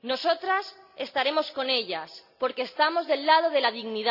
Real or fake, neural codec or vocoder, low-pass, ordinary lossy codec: real; none; 5.4 kHz; none